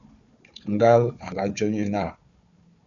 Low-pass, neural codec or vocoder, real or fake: 7.2 kHz; codec, 16 kHz, 4 kbps, FunCodec, trained on Chinese and English, 50 frames a second; fake